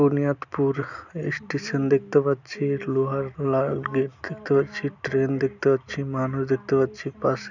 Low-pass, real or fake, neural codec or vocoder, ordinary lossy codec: 7.2 kHz; real; none; none